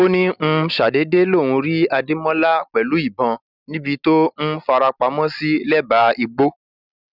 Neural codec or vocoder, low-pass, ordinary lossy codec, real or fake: none; 5.4 kHz; none; real